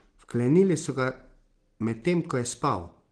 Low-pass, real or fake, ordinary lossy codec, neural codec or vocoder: 9.9 kHz; real; Opus, 16 kbps; none